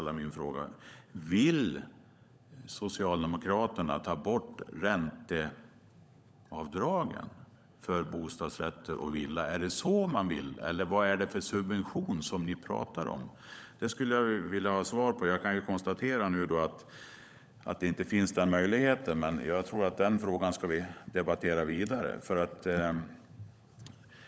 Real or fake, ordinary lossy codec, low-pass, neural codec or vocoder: fake; none; none; codec, 16 kHz, 16 kbps, FunCodec, trained on LibriTTS, 50 frames a second